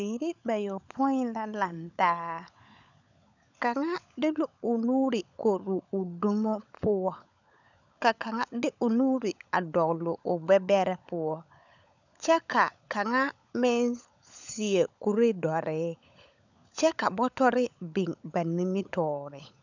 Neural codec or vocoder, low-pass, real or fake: codec, 16 kHz, 16 kbps, FunCodec, trained on LibriTTS, 50 frames a second; 7.2 kHz; fake